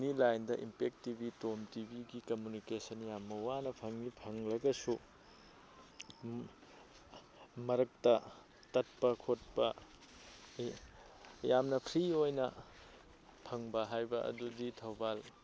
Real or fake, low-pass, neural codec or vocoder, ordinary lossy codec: real; none; none; none